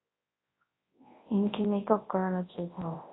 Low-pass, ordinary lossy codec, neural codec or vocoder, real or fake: 7.2 kHz; AAC, 16 kbps; codec, 24 kHz, 0.9 kbps, WavTokenizer, large speech release; fake